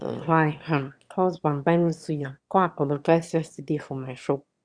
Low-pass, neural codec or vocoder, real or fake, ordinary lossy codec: 9.9 kHz; autoencoder, 22.05 kHz, a latent of 192 numbers a frame, VITS, trained on one speaker; fake; Opus, 64 kbps